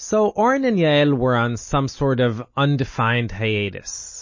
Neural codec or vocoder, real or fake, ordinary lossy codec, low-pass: none; real; MP3, 32 kbps; 7.2 kHz